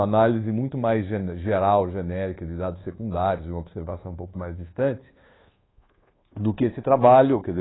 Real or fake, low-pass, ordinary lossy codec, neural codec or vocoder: fake; 7.2 kHz; AAC, 16 kbps; codec, 24 kHz, 1.2 kbps, DualCodec